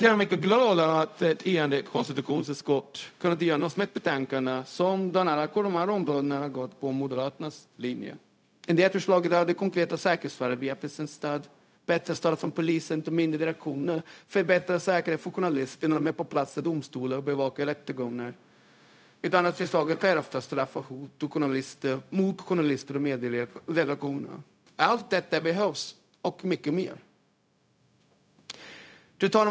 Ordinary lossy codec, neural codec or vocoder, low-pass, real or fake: none; codec, 16 kHz, 0.4 kbps, LongCat-Audio-Codec; none; fake